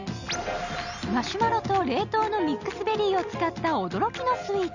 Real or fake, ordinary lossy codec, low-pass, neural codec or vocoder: real; none; 7.2 kHz; none